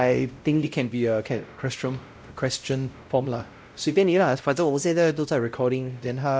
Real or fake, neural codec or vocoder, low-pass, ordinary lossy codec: fake; codec, 16 kHz, 0.5 kbps, X-Codec, WavLM features, trained on Multilingual LibriSpeech; none; none